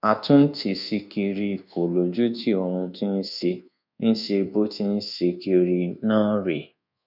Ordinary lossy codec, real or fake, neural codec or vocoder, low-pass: none; fake; codec, 24 kHz, 1.2 kbps, DualCodec; 5.4 kHz